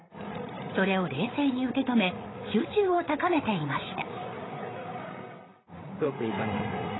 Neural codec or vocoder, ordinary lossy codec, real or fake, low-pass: codec, 16 kHz, 8 kbps, FreqCodec, larger model; AAC, 16 kbps; fake; 7.2 kHz